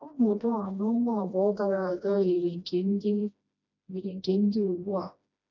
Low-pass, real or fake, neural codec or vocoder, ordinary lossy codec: 7.2 kHz; fake; codec, 16 kHz, 1 kbps, FreqCodec, smaller model; none